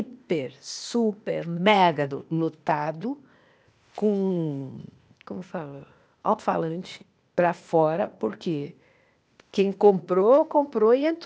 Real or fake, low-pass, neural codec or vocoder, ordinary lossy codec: fake; none; codec, 16 kHz, 0.8 kbps, ZipCodec; none